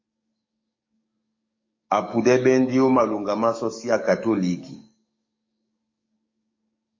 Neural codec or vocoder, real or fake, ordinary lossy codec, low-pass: codec, 44.1 kHz, 7.8 kbps, DAC; fake; MP3, 32 kbps; 7.2 kHz